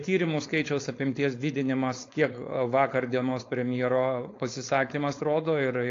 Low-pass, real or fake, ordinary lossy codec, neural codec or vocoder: 7.2 kHz; fake; AAC, 48 kbps; codec, 16 kHz, 4.8 kbps, FACodec